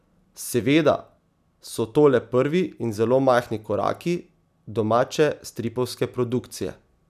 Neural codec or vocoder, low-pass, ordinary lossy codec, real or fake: none; 14.4 kHz; none; real